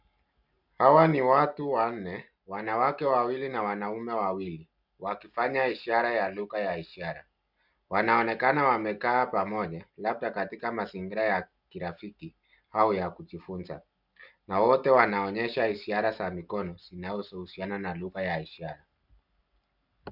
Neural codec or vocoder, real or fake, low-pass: none; real; 5.4 kHz